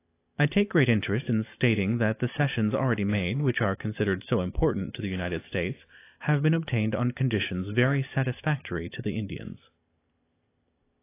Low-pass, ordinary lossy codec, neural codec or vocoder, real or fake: 3.6 kHz; AAC, 24 kbps; none; real